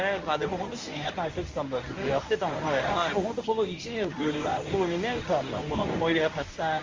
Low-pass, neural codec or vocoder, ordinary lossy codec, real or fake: 7.2 kHz; codec, 24 kHz, 0.9 kbps, WavTokenizer, medium speech release version 1; Opus, 32 kbps; fake